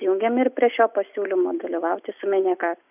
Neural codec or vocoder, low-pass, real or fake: none; 3.6 kHz; real